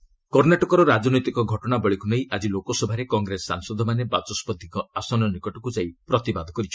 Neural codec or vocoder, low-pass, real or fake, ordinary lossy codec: none; none; real; none